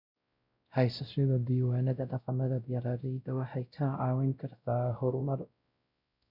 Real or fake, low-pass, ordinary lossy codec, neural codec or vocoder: fake; 5.4 kHz; none; codec, 16 kHz, 0.5 kbps, X-Codec, WavLM features, trained on Multilingual LibriSpeech